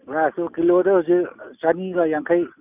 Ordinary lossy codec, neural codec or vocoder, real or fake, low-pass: none; none; real; 3.6 kHz